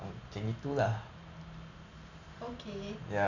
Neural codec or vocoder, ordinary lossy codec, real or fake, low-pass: none; none; real; 7.2 kHz